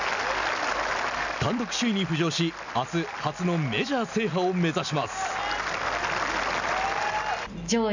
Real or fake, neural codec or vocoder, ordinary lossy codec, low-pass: real; none; none; 7.2 kHz